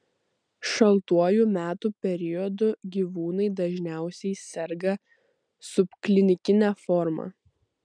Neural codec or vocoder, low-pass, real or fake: none; 9.9 kHz; real